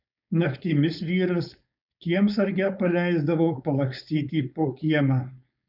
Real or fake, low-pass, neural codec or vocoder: fake; 5.4 kHz; codec, 16 kHz, 4.8 kbps, FACodec